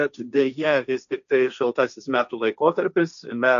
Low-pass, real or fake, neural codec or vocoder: 7.2 kHz; fake; codec, 16 kHz, 1.1 kbps, Voila-Tokenizer